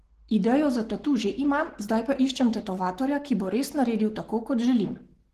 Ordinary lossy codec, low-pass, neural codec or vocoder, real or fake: Opus, 16 kbps; 14.4 kHz; codec, 44.1 kHz, 7.8 kbps, Pupu-Codec; fake